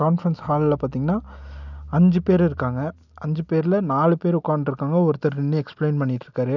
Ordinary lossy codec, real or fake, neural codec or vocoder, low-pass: none; real; none; 7.2 kHz